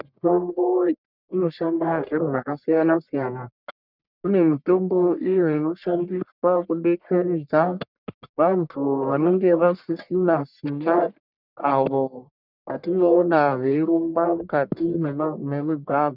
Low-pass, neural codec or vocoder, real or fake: 5.4 kHz; codec, 44.1 kHz, 1.7 kbps, Pupu-Codec; fake